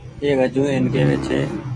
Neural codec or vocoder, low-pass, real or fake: vocoder, 24 kHz, 100 mel bands, Vocos; 9.9 kHz; fake